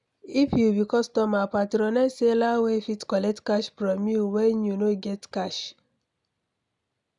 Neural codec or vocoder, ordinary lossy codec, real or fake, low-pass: none; none; real; 10.8 kHz